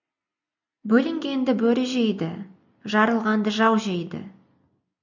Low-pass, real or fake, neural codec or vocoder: 7.2 kHz; real; none